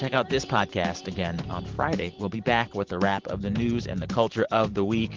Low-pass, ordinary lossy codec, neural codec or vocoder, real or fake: 7.2 kHz; Opus, 16 kbps; vocoder, 44.1 kHz, 128 mel bands every 512 samples, BigVGAN v2; fake